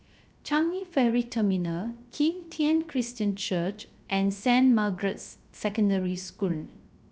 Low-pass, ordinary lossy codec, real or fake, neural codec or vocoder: none; none; fake; codec, 16 kHz, 0.3 kbps, FocalCodec